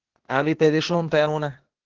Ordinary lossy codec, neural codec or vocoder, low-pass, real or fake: Opus, 16 kbps; codec, 16 kHz, 0.8 kbps, ZipCodec; 7.2 kHz; fake